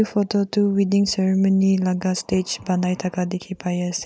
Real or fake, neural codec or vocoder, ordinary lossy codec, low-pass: real; none; none; none